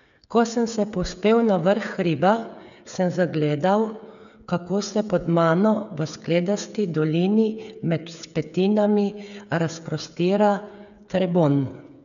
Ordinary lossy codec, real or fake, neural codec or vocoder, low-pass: none; fake; codec, 16 kHz, 8 kbps, FreqCodec, smaller model; 7.2 kHz